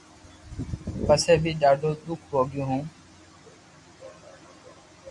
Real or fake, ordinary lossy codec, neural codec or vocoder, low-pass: real; Opus, 64 kbps; none; 10.8 kHz